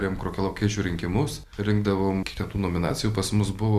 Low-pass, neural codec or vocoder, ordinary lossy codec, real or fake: 14.4 kHz; none; Opus, 64 kbps; real